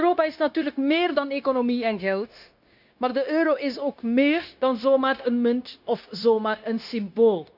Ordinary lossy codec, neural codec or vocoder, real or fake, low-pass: none; codec, 16 kHz, 0.9 kbps, LongCat-Audio-Codec; fake; 5.4 kHz